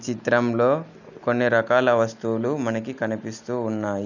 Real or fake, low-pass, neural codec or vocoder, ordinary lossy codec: real; 7.2 kHz; none; none